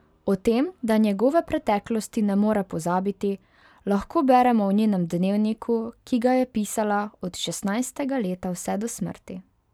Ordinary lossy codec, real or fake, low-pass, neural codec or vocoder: none; fake; 19.8 kHz; vocoder, 44.1 kHz, 128 mel bands every 512 samples, BigVGAN v2